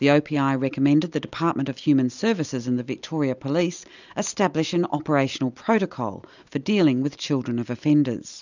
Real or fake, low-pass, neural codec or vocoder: real; 7.2 kHz; none